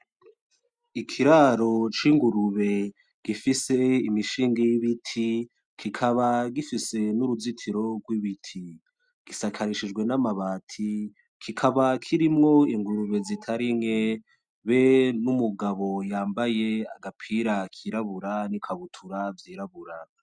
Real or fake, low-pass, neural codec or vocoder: real; 9.9 kHz; none